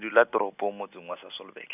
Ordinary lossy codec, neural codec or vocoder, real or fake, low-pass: none; none; real; 3.6 kHz